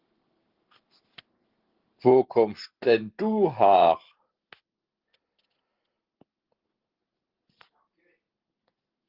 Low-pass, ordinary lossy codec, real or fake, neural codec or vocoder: 5.4 kHz; Opus, 16 kbps; real; none